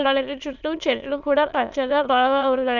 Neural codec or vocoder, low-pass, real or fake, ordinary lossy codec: autoencoder, 22.05 kHz, a latent of 192 numbers a frame, VITS, trained on many speakers; 7.2 kHz; fake; none